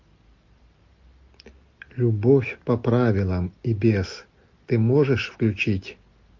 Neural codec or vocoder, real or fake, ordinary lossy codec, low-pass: none; real; MP3, 48 kbps; 7.2 kHz